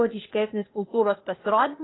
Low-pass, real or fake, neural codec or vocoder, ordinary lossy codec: 7.2 kHz; fake; codec, 16 kHz, 0.8 kbps, ZipCodec; AAC, 16 kbps